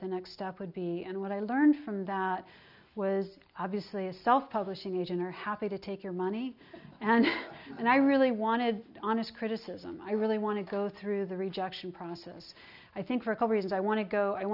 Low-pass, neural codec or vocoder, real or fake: 5.4 kHz; none; real